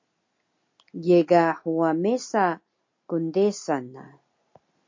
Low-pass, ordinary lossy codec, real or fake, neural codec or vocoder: 7.2 kHz; MP3, 48 kbps; real; none